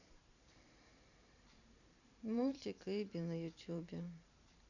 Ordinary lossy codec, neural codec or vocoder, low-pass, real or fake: none; vocoder, 44.1 kHz, 128 mel bands every 512 samples, BigVGAN v2; 7.2 kHz; fake